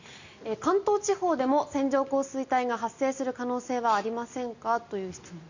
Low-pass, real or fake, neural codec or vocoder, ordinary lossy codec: 7.2 kHz; real; none; Opus, 64 kbps